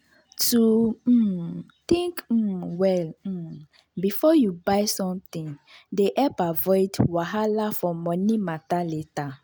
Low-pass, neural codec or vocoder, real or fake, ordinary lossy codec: none; none; real; none